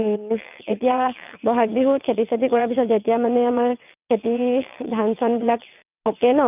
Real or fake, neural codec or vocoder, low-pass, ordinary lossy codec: fake; vocoder, 22.05 kHz, 80 mel bands, WaveNeXt; 3.6 kHz; none